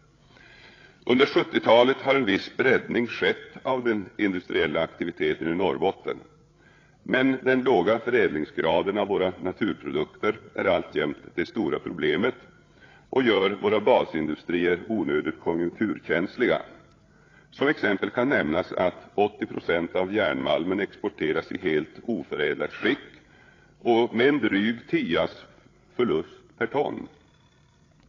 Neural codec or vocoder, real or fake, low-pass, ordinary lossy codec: codec, 16 kHz, 8 kbps, FreqCodec, larger model; fake; 7.2 kHz; AAC, 32 kbps